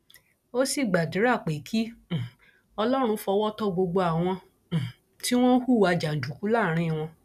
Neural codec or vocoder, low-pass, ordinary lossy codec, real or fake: none; 14.4 kHz; none; real